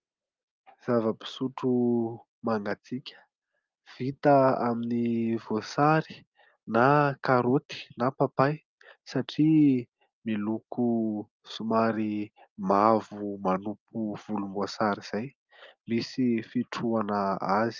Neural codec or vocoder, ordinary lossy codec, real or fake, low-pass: none; Opus, 24 kbps; real; 7.2 kHz